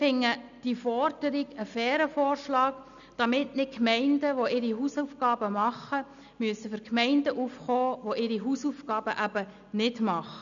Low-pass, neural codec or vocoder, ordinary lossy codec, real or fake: 7.2 kHz; none; none; real